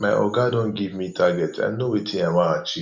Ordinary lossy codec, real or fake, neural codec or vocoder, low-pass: Opus, 64 kbps; real; none; 7.2 kHz